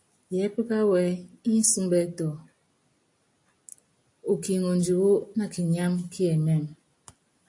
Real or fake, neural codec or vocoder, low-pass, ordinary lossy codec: real; none; 10.8 kHz; AAC, 64 kbps